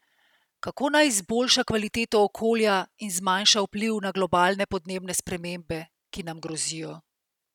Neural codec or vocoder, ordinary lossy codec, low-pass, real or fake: none; none; 19.8 kHz; real